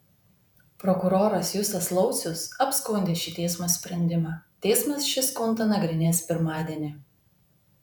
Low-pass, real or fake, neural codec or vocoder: 19.8 kHz; fake; vocoder, 48 kHz, 128 mel bands, Vocos